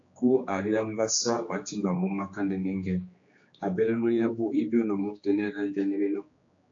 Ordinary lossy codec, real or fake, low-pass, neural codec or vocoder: AAC, 48 kbps; fake; 7.2 kHz; codec, 16 kHz, 2 kbps, X-Codec, HuBERT features, trained on general audio